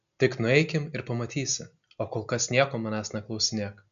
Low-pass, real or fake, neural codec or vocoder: 7.2 kHz; real; none